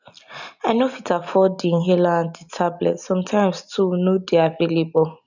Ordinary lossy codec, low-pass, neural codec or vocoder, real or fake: none; 7.2 kHz; none; real